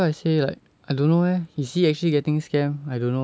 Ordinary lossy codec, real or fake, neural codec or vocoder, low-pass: none; real; none; none